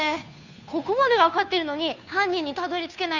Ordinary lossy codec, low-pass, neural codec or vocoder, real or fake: none; 7.2 kHz; codec, 16 kHz, 2 kbps, FunCodec, trained on Chinese and English, 25 frames a second; fake